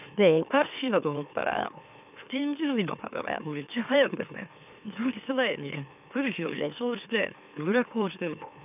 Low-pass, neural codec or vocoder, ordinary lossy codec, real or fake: 3.6 kHz; autoencoder, 44.1 kHz, a latent of 192 numbers a frame, MeloTTS; none; fake